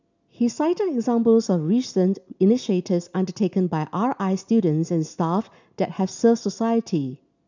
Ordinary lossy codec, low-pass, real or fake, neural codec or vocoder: none; 7.2 kHz; real; none